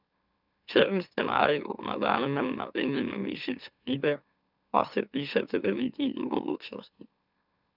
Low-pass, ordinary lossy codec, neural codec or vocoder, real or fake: 5.4 kHz; none; autoencoder, 44.1 kHz, a latent of 192 numbers a frame, MeloTTS; fake